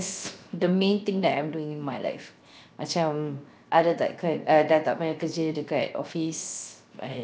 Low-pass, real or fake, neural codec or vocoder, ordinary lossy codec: none; fake; codec, 16 kHz, 0.7 kbps, FocalCodec; none